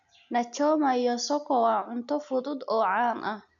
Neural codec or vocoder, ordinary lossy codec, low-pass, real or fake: none; none; 7.2 kHz; real